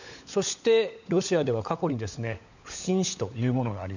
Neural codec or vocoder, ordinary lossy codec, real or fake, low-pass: codec, 16 kHz, 16 kbps, FunCodec, trained on LibriTTS, 50 frames a second; none; fake; 7.2 kHz